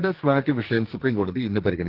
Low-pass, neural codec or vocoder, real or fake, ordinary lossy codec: 5.4 kHz; codec, 44.1 kHz, 2.6 kbps, SNAC; fake; Opus, 16 kbps